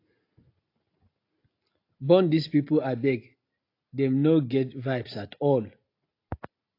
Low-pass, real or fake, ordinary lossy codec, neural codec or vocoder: 5.4 kHz; real; AAC, 32 kbps; none